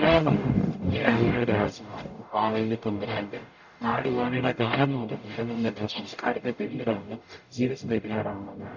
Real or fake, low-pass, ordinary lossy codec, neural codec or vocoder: fake; 7.2 kHz; none; codec, 44.1 kHz, 0.9 kbps, DAC